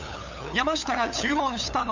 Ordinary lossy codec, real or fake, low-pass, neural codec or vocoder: none; fake; 7.2 kHz; codec, 16 kHz, 8 kbps, FunCodec, trained on LibriTTS, 25 frames a second